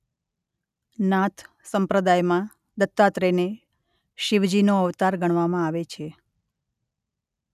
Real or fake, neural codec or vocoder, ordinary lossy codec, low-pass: real; none; none; 14.4 kHz